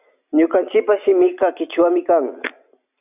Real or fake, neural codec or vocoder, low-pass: real; none; 3.6 kHz